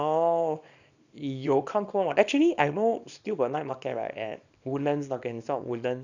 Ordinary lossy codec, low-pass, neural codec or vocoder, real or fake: none; 7.2 kHz; codec, 24 kHz, 0.9 kbps, WavTokenizer, small release; fake